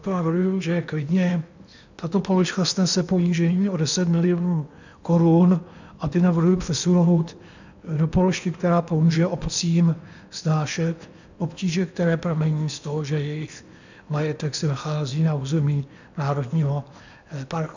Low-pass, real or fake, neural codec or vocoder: 7.2 kHz; fake; codec, 16 kHz in and 24 kHz out, 0.8 kbps, FocalCodec, streaming, 65536 codes